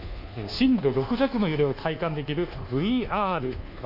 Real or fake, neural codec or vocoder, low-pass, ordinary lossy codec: fake; codec, 24 kHz, 1.2 kbps, DualCodec; 5.4 kHz; none